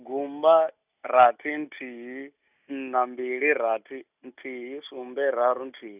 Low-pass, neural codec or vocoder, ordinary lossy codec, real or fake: 3.6 kHz; none; none; real